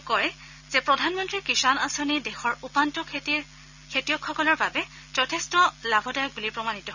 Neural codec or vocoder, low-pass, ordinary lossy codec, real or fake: none; 7.2 kHz; none; real